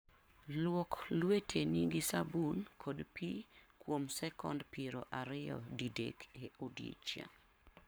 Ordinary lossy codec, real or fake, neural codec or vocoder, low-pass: none; fake; codec, 44.1 kHz, 7.8 kbps, Pupu-Codec; none